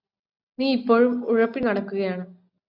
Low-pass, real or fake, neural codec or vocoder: 5.4 kHz; real; none